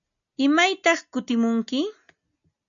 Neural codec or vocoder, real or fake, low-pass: none; real; 7.2 kHz